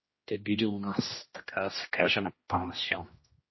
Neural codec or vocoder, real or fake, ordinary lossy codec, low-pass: codec, 16 kHz, 1 kbps, X-Codec, HuBERT features, trained on general audio; fake; MP3, 24 kbps; 7.2 kHz